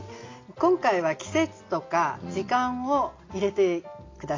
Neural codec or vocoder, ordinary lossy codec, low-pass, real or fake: none; AAC, 32 kbps; 7.2 kHz; real